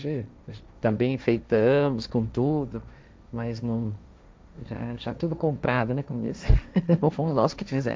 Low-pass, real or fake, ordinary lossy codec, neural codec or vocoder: none; fake; none; codec, 16 kHz, 1.1 kbps, Voila-Tokenizer